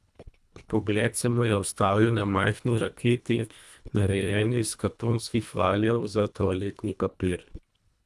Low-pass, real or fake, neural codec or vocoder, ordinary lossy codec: none; fake; codec, 24 kHz, 1.5 kbps, HILCodec; none